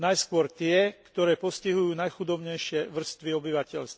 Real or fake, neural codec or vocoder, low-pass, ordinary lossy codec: real; none; none; none